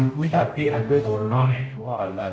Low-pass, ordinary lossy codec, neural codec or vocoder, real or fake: none; none; codec, 16 kHz, 0.5 kbps, X-Codec, HuBERT features, trained on general audio; fake